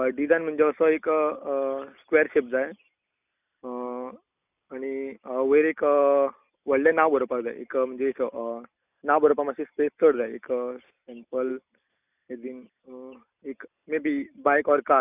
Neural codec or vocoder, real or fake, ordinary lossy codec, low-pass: none; real; none; 3.6 kHz